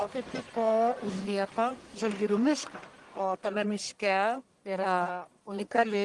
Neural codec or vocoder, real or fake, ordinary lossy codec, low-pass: codec, 44.1 kHz, 1.7 kbps, Pupu-Codec; fake; Opus, 24 kbps; 10.8 kHz